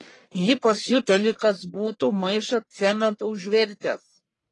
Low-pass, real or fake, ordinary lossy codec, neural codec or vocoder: 10.8 kHz; fake; AAC, 32 kbps; codec, 44.1 kHz, 1.7 kbps, Pupu-Codec